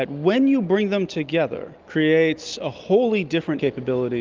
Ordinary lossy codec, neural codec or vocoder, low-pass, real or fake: Opus, 24 kbps; none; 7.2 kHz; real